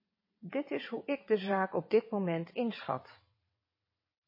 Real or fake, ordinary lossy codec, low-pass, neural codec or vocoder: fake; MP3, 24 kbps; 5.4 kHz; vocoder, 22.05 kHz, 80 mel bands, WaveNeXt